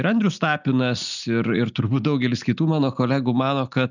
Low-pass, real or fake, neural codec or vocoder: 7.2 kHz; real; none